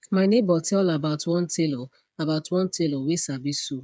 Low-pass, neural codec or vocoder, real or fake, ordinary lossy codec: none; codec, 16 kHz, 8 kbps, FreqCodec, smaller model; fake; none